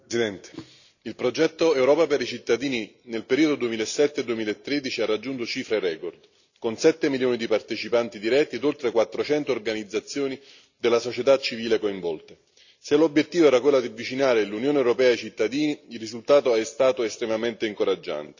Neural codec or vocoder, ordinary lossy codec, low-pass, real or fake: none; none; 7.2 kHz; real